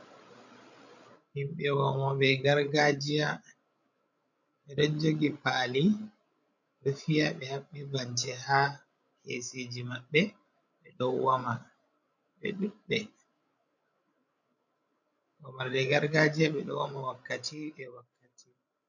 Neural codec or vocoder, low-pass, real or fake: codec, 16 kHz, 16 kbps, FreqCodec, larger model; 7.2 kHz; fake